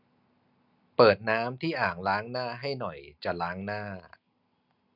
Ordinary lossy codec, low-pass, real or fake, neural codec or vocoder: none; 5.4 kHz; real; none